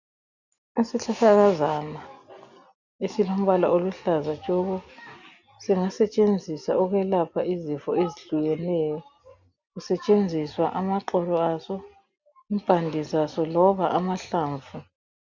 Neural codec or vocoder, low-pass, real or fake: none; 7.2 kHz; real